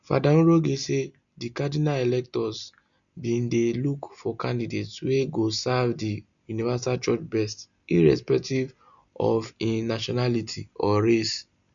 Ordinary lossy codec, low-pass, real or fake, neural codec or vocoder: none; 7.2 kHz; real; none